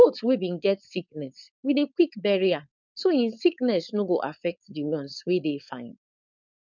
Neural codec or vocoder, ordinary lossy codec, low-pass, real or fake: codec, 16 kHz, 4.8 kbps, FACodec; none; 7.2 kHz; fake